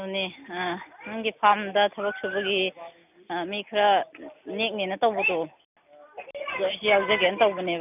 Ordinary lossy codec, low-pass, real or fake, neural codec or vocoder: none; 3.6 kHz; real; none